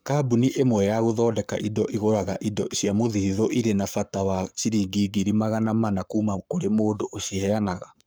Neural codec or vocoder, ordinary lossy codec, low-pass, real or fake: codec, 44.1 kHz, 7.8 kbps, Pupu-Codec; none; none; fake